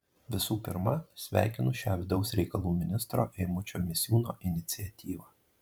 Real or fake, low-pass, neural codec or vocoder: fake; 19.8 kHz; vocoder, 44.1 kHz, 128 mel bands every 512 samples, BigVGAN v2